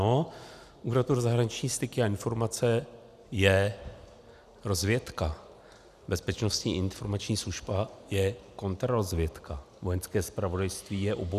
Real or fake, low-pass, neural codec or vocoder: real; 14.4 kHz; none